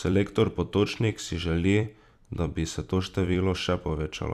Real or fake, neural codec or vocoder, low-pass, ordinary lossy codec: fake; vocoder, 44.1 kHz, 128 mel bands every 256 samples, BigVGAN v2; 14.4 kHz; none